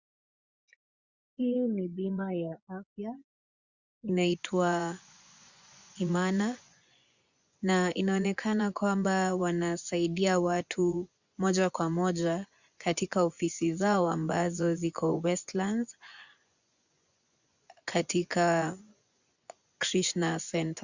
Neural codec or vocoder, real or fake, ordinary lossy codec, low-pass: vocoder, 24 kHz, 100 mel bands, Vocos; fake; Opus, 64 kbps; 7.2 kHz